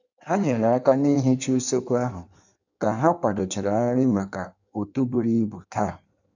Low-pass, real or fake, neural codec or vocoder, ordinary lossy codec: 7.2 kHz; fake; codec, 16 kHz in and 24 kHz out, 1.1 kbps, FireRedTTS-2 codec; none